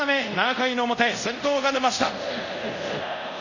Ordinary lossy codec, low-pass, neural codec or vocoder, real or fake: none; 7.2 kHz; codec, 24 kHz, 0.5 kbps, DualCodec; fake